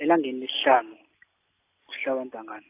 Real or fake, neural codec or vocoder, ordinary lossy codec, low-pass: real; none; AAC, 24 kbps; 3.6 kHz